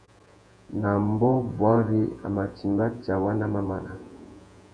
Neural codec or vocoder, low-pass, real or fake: vocoder, 48 kHz, 128 mel bands, Vocos; 9.9 kHz; fake